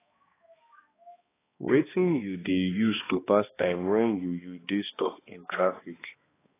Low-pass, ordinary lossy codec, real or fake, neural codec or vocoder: 3.6 kHz; AAC, 16 kbps; fake; codec, 16 kHz, 2 kbps, X-Codec, HuBERT features, trained on balanced general audio